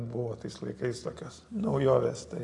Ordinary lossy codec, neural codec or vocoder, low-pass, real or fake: AAC, 48 kbps; none; 10.8 kHz; real